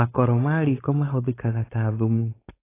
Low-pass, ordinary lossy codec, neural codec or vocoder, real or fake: 3.6 kHz; AAC, 24 kbps; codec, 16 kHz, 4.8 kbps, FACodec; fake